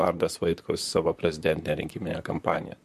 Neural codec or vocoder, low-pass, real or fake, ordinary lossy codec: vocoder, 44.1 kHz, 128 mel bands, Pupu-Vocoder; 14.4 kHz; fake; MP3, 64 kbps